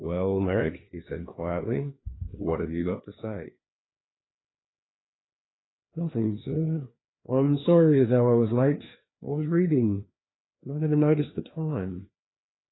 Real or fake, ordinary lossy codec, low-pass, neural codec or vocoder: fake; AAC, 16 kbps; 7.2 kHz; codec, 16 kHz, 2 kbps, FreqCodec, larger model